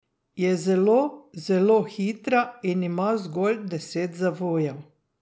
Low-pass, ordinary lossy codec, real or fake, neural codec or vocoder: none; none; real; none